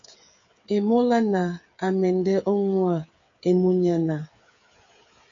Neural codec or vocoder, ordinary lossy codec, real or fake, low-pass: codec, 16 kHz, 8 kbps, FreqCodec, smaller model; MP3, 48 kbps; fake; 7.2 kHz